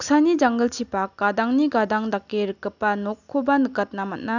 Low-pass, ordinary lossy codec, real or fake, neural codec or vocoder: 7.2 kHz; none; real; none